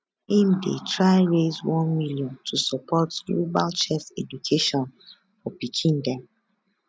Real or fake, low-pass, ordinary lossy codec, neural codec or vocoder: real; none; none; none